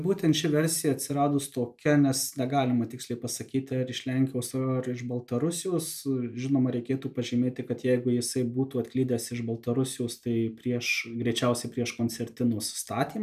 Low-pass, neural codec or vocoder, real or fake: 14.4 kHz; none; real